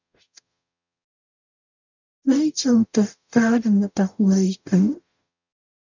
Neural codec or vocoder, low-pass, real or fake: codec, 44.1 kHz, 0.9 kbps, DAC; 7.2 kHz; fake